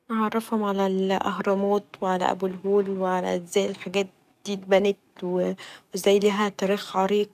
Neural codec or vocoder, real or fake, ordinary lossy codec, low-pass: codec, 44.1 kHz, 7.8 kbps, DAC; fake; none; 14.4 kHz